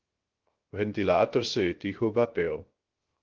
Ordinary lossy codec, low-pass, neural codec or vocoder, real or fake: Opus, 16 kbps; 7.2 kHz; codec, 16 kHz, 0.3 kbps, FocalCodec; fake